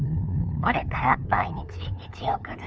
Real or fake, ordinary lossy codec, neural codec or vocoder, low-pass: fake; none; codec, 16 kHz, 2 kbps, FunCodec, trained on LibriTTS, 25 frames a second; none